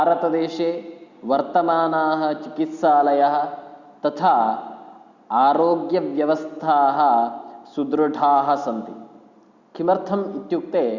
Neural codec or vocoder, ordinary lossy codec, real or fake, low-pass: none; Opus, 64 kbps; real; 7.2 kHz